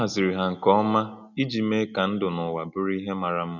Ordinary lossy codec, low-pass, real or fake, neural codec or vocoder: none; 7.2 kHz; real; none